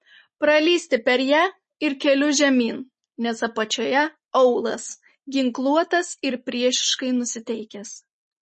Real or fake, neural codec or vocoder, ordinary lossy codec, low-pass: real; none; MP3, 32 kbps; 10.8 kHz